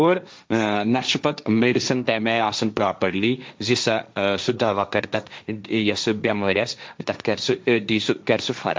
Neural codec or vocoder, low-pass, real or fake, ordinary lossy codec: codec, 16 kHz, 1.1 kbps, Voila-Tokenizer; none; fake; none